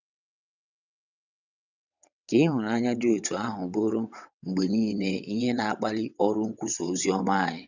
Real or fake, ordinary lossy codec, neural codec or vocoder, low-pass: fake; none; vocoder, 22.05 kHz, 80 mel bands, WaveNeXt; 7.2 kHz